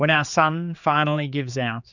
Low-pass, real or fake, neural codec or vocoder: 7.2 kHz; fake; codec, 16 kHz, 4 kbps, X-Codec, HuBERT features, trained on general audio